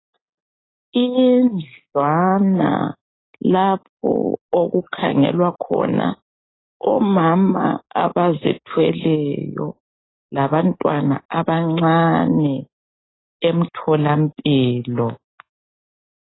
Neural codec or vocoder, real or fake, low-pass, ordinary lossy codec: none; real; 7.2 kHz; AAC, 16 kbps